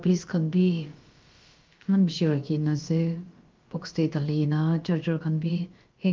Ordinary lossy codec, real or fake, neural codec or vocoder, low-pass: Opus, 32 kbps; fake; codec, 16 kHz, about 1 kbps, DyCAST, with the encoder's durations; 7.2 kHz